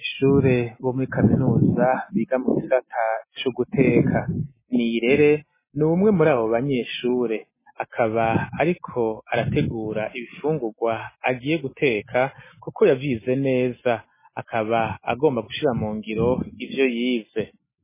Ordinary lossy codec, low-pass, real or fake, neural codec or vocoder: MP3, 16 kbps; 3.6 kHz; real; none